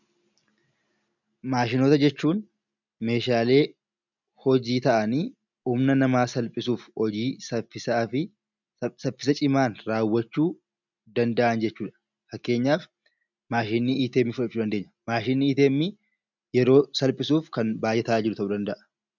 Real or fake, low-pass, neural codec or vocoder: real; 7.2 kHz; none